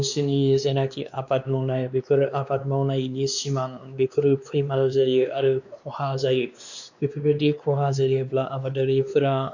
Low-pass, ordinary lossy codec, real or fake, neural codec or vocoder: 7.2 kHz; none; fake; codec, 16 kHz, 2 kbps, X-Codec, WavLM features, trained on Multilingual LibriSpeech